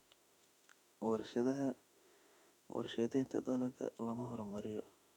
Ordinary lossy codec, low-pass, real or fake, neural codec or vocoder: none; 19.8 kHz; fake; autoencoder, 48 kHz, 32 numbers a frame, DAC-VAE, trained on Japanese speech